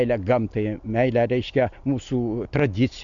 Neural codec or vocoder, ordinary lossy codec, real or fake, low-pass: none; AAC, 64 kbps; real; 7.2 kHz